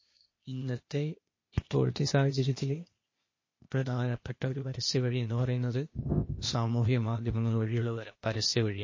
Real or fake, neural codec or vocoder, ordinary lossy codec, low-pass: fake; codec, 16 kHz, 0.8 kbps, ZipCodec; MP3, 32 kbps; 7.2 kHz